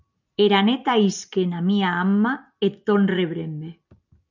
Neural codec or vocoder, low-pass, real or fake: none; 7.2 kHz; real